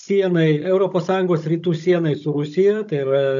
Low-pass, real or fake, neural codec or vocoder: 7.2 kHz; fake; codec, 16 kHz, 16 kbps, FunCodec, trained on Chinese and English, 50 frames a second